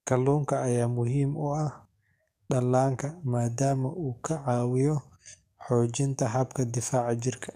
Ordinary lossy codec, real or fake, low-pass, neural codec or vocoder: none; fake; 14.4 kHz; codec, 44.1 kHz, 7.8 kbps, DAC